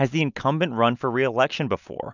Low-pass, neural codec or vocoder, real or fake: 7.2 kHz; none; real